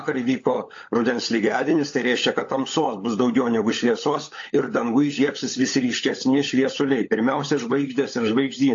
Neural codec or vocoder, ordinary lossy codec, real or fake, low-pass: codec, 16 kHz, 16 kbps, FunCodec, trained on LibriTTS, 50 frames a second; AAC, 48 kbps; fake; 7.2 kHz